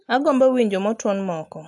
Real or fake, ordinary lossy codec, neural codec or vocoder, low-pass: real; AAC, 64 kbps; none; 10.8 kHz